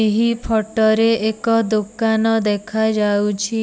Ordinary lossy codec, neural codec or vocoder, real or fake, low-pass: none; none; real; none